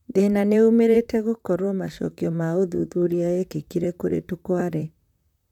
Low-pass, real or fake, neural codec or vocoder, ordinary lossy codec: 19.8 kHz; fake; vocoder, 44.1 kHz, 128 mel bands, Pupu-Vocoder; none